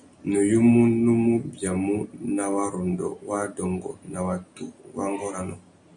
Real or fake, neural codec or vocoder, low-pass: real; none; 9.9 kHz